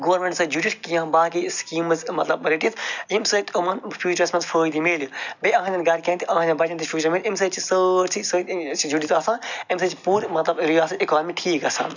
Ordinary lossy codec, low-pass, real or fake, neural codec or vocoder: none; 7.2 kHz; real; none